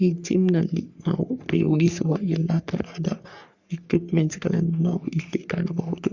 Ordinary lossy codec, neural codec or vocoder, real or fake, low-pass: Opus, 64 kbps; codec, 44.1 kHz, 3.4 kbps, Pupu-Codec; fake; 7.2 kHz